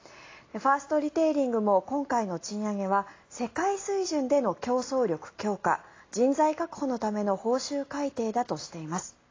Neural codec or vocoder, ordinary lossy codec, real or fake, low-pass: none; AAC, 32 kbps; real; 7.2 kHz